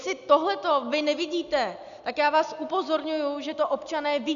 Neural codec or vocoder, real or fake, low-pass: none; real; 7.2 kHz